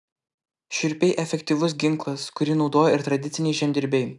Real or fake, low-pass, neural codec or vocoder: real; 10.8 kHz; none